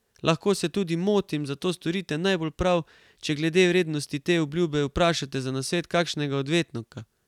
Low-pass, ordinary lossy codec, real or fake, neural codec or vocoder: 19.8 kHz; none; real; none